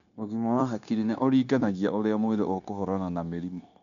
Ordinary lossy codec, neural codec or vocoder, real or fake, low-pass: none; codec, 16 kHz, 0.9 kbps, LongCat-Audio-Codec; fake; 7.2 kHz